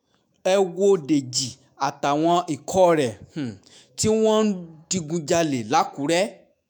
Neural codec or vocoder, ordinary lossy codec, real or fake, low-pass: autoencoder, 48 kHz, 128 numbers a frame, DAC-VAE, trained on Japanese speech; none; fake; none